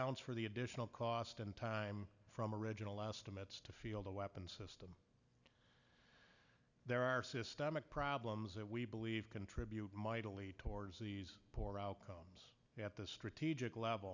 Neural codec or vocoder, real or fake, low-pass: none; real; 7.2 kHz